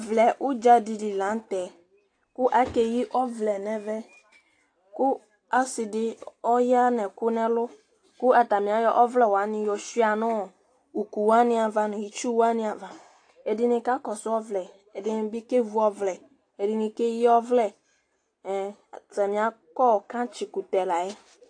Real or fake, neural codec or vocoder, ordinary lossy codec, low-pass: real; none; AAC, 48 kbps; 9.9 kHz